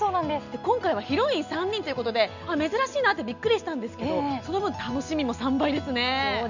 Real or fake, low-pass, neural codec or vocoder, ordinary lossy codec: real; 7.2 kHz; none; none